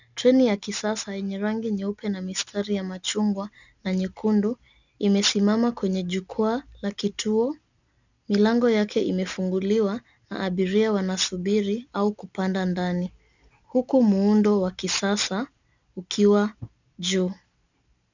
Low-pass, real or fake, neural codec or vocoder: 7.2 kHz; real; none